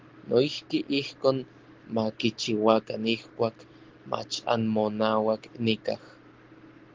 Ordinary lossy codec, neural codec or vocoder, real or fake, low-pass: Opus, 16 kbps; none; real; 7.2 kHz